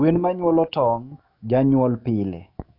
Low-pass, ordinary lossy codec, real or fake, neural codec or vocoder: 5.4 kHz; AAC, 32 kbps; fake; autoencoder, 48 kHz, 128 numbers a frame, DAC-VAE, trained on Japanese speech